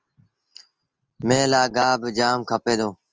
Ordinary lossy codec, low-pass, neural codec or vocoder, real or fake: Opus, 24 kbps; 7.2 kHz; none; real